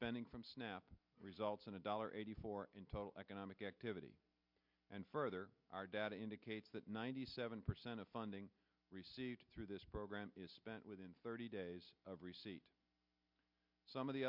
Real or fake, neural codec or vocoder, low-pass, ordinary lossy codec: real; none; 5.4 kHz; MP3, 48 kbps